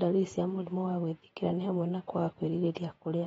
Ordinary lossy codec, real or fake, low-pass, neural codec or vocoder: AAC, 24 kbps; fake; 19.8 kHz; vocoder, 48 kHz, 128 mel bands, Vocos